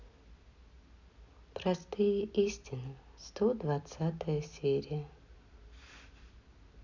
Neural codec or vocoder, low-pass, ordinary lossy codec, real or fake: none; 7.2 kHz; none; real